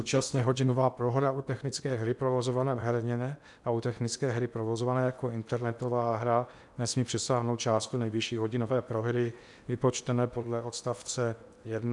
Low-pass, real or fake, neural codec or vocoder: 10.8 kHz; fake; codec, 16 kHz in and 24 kHz out, 0.8 kbps, FocalCodec, streaming, 65536 codes